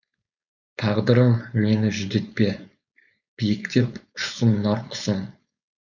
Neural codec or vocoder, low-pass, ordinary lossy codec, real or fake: codec, 16 kHz, 4.8 kbps, FACodec; 7.2 kHz; none; fake